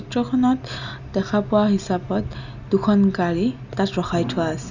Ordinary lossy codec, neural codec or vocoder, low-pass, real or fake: none; none; 7.2 kHz; real